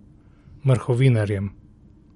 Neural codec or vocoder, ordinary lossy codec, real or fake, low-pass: none; MP3, 48 kbps; real; 19.8 kHz